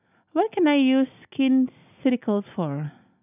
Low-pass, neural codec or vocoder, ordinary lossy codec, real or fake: 3.6 kHz; none; none; real